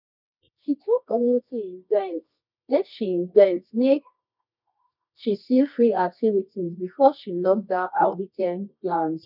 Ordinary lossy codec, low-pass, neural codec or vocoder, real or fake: none; 5.4 kHz; codec, 24 kHz, 0.9 kbps, WavTokenizer, medium music audio release; fake